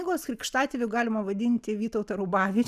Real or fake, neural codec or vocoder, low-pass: real; none; 14.4 kHz